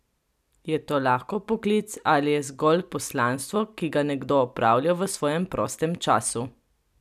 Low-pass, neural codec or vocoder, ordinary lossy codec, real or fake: 14.4 kHz; none; none; real